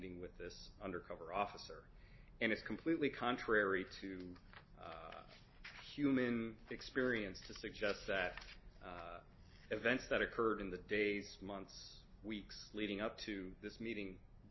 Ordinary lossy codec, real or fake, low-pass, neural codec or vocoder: MP3, 24 kbps; real; 7.2 kHz; none